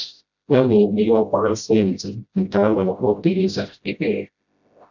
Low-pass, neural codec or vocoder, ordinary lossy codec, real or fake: 7.2 kHz; codec, 16 kHz, 0.5 kbps, FreqCodec, smaller model; none; fake